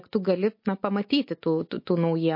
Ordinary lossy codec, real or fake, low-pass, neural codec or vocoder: MP3, 32 kbps; real; 5.4 kHz; none